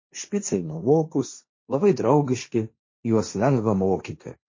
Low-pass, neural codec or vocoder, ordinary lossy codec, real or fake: 7.2 kHz; codec, 16 kHz, 1.1 kbps, Voila-Tokenizer; MP3, 32 kbps; fake